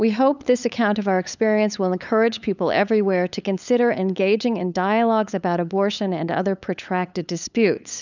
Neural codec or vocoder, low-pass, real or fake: codec, 16 kHz, 8 kbps, FunCodec, trained on LibriTTS, 25 frames a second; 7.2 kHz; fake